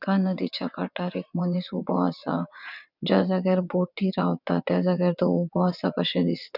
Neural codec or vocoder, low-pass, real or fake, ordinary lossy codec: vocoder, 22.05 kHz, 80 mel bands, WaveNeXt; 5.4 kHz; fake; none